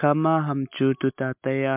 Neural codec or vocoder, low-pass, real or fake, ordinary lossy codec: none; 3.6 kHz; real; MP3, 32 kbps